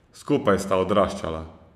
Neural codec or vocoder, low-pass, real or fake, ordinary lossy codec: vocoder, 48 kHz, 128 mel bands, Vocos; 14.4 kHz; fake; none